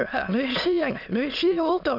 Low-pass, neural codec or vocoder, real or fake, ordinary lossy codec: 5.4 kHz; autoencoder, 22.05 kHz, a latent of 192 numbers a frame, VITS, trained on many speakers; fake; none